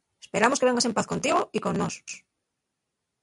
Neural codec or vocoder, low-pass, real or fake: none; 10.8 kHz; real